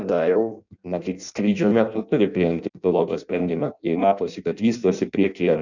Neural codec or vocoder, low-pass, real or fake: codec, 16 kHz in and 24 kHz out, 0.6 kbps, FireRedTTS-2 codec; 7.2 kHz; fake